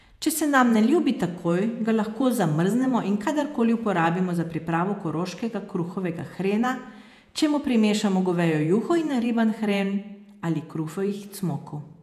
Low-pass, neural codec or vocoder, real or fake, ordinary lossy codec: 14.4 kHz; vocoder, 48 kHz, 128 mel bands, Vocos; fake; none